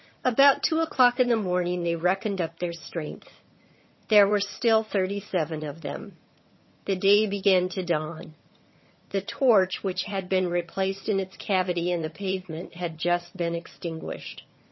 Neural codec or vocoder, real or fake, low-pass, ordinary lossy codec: vocoder, 22.05 kHz, 80 mel bands, HiFi-GAN; fake; 7.2 kHz; MP3, 24 kbps